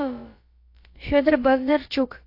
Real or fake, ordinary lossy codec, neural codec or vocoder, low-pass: fake; AAC, 32 kbps; codec, 16 kHz, about 1 kbps, DyCAST, with the encoder's durations; 5.4 kHz